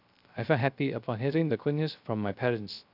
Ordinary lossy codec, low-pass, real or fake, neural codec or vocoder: none; 5.4 kHz; fake; codec, 16 kHz, 0.3 kbps, FocalCodec